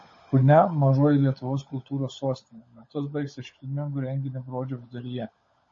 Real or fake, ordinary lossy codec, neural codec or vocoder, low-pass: fake; MP3, 32 kbps; codec, 16 kHz, 16 kbps, FunCodec, trained on LibriTTS, 50 frames a second; 7.2 kHz